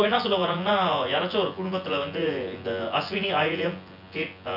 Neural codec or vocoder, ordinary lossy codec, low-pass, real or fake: vocoder, 24 kHz, 100 mel bands, Vocos; none; 5.4 kHz; fake